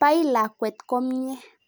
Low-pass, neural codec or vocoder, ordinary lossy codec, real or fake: none; none; none; real